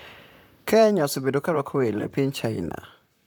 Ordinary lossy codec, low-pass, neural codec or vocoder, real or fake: none; none; vocoder, 44.1 kHz, 128 mel bands, Pupu-Vocoder; fake